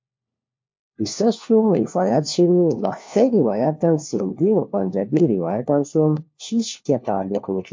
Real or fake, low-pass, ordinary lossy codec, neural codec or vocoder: fake; 7.2 kHz; MP3, 48 kbps; codec, 16 kHz, 1 kbps, FunCodec, trained on LibriTTS, 50 frames a second